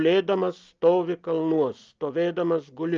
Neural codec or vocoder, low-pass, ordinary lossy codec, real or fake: none; 7.2 kHz; Opus, 24 kbps; real